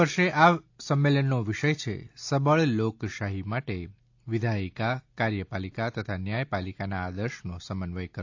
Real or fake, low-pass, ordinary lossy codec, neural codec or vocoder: real; 7.2 kHz; AAC, 48 kbps; none